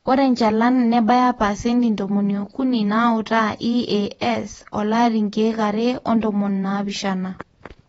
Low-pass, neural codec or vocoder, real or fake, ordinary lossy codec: 19.8 kHz; vocoder, 48 kHz, 128 mel bands, Vocos; fake; AAC, 24 kbps